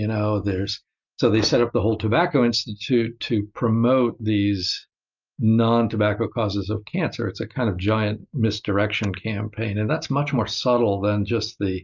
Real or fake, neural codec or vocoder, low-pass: real; none; 7.2 kHz